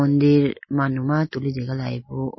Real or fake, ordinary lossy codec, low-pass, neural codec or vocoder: real; MP3, 24 kbps; 7.2 kHz; none